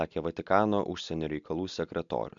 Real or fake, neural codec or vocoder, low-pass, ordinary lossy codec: real; none; 7.2 kHz; MP3, 64 kbps